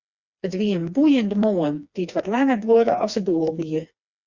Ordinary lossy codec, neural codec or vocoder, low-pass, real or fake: Opus, 64 kbps; codec, 16 kHz, 2 kbps, FreqCodec, smaller model; 7.2 kHz; fake